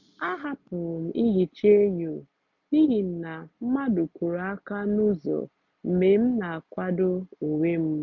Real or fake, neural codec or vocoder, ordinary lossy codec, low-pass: real; none; none; 7.2 kHz